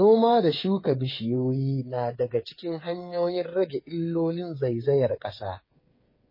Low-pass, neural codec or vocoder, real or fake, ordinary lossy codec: 5.4 kHz; codec, 16 kHz, 8 kbps, FreqCodec, smaller model; fake; MP3, 24 kbps